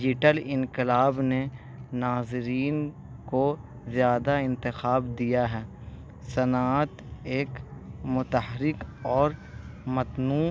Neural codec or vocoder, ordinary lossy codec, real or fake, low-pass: none; none; real; none